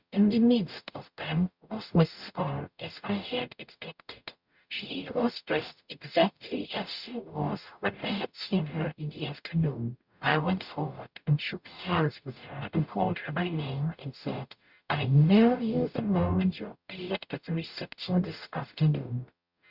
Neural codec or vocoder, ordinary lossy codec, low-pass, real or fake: codec, 44.1 kHz, 0.9 kbps, DAC; Opus, 64 kbps; 5.4 kHz; fake